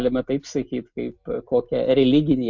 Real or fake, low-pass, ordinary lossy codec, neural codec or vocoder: real; 7.2 kHz; MP3, 64 kbps; none